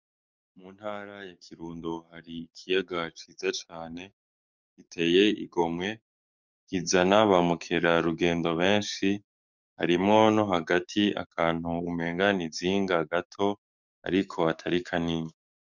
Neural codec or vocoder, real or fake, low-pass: codec, 44.1 kHz, 7.8 kbps, DAC; fake; 7.2 kHz